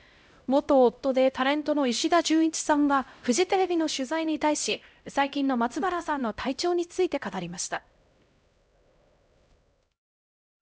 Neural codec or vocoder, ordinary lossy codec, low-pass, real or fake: codec, 16 kHz, 0.5 kbps, X-Codec, HuBERT features, trained on LibriSpeech; none; none; fake